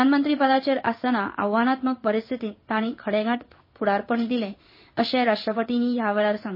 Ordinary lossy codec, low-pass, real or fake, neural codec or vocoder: MP3, 24 kbps; 5.4 kHz; fake; codec, 16 kHz in and 24 kHz out, 1 kbps, XY-Tokenizer